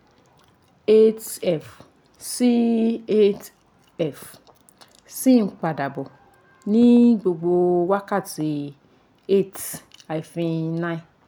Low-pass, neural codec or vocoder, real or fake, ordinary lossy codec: none; none; real; none